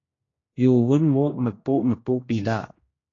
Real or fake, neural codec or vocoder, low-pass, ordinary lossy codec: fake; codec, 16 kHz, 1 kbps, X-Codec, HuBERT features, trained on general audio; 7.2 kHz; AAC, 32 kbps